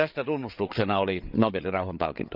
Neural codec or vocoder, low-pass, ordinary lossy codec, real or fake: codec, 16 kHz, 8 kbps, FreqCodec, larger model; 5.4 kHz; Opus, 24 kbps; fake